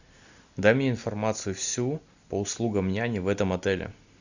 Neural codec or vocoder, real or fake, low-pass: none; real; 7.2 kHz